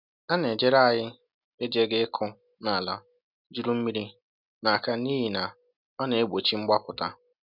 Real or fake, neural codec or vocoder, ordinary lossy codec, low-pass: real; none; none; 5.4 kHz